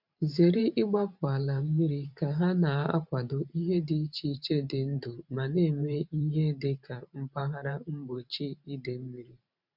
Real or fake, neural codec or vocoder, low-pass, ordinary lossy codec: fake; vocoder, 44.1 kHz, 128 mel bands every 512 samples, BigVGAN v2; 5.4 kHz; none